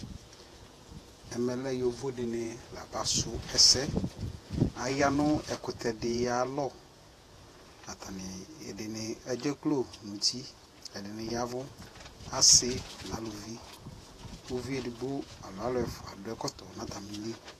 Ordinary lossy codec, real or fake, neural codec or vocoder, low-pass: AAC, 48 kbps; fake; vocoder, 48 kHz, 128 mel bands, Vocos; 14.4 kHz